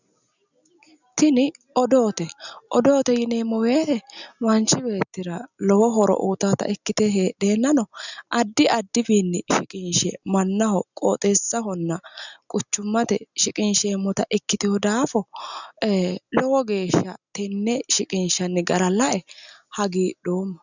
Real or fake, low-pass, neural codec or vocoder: real; 7.2 kHz; none